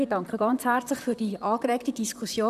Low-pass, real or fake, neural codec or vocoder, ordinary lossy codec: 14.4 kHz; fake; vocoder, 44.1 kHz, 128 mel bands, Pupu-Vocoder; none